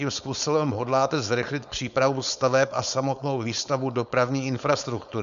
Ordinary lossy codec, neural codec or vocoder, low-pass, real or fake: MP3, 96 kbps; codec, 16 kHz, 4.8 kbps, FACodec; 7.2 kHz; fake